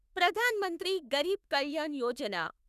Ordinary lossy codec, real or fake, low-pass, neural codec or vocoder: none; fake; 14.4 kHz; autoencoder, 48 kHz, 32 numbers a frame, DAC-VAE, trained on Japanese speech